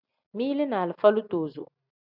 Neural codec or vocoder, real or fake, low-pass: none; real; 5.4 kHz